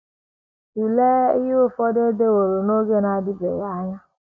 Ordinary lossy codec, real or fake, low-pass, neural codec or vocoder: none; real; none; none